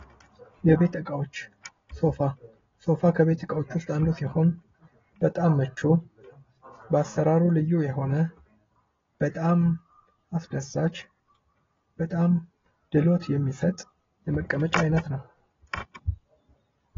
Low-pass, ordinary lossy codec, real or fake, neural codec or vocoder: 7.2 kHz; AAC, 24 kbps; real; none